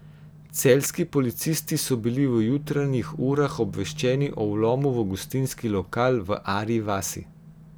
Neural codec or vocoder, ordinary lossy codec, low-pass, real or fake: none; none; none; real